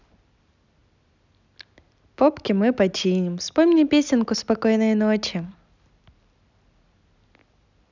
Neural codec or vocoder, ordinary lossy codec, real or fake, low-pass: none; none; real; 7.2 kHz